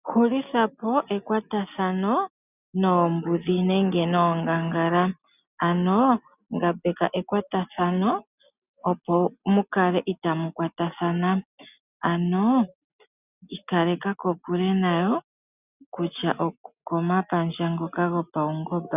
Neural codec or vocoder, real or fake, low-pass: none; real; 3.6 kHz